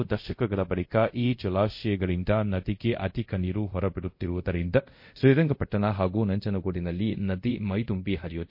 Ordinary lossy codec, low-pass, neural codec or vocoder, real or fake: MP3, 32 kbps; 5.4 kHz; codec, 24 kHz, 0.5 kbps, DualCodec; fake